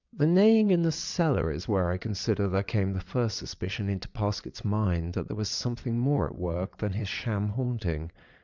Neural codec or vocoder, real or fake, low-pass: vocoder, 22.05 kHz, 80 mel bands, WaveNeXt; fake; 7.2 kHz